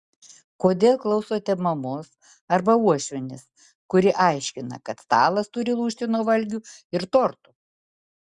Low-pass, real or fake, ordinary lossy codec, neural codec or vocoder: 10.8 kHz; real; Opus, 64 kbps; none